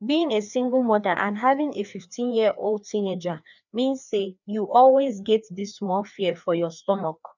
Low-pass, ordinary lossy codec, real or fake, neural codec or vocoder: 7.2 kHz; none; fake; codec, 16 kHz, 2 kbps, FreqCodec, larger model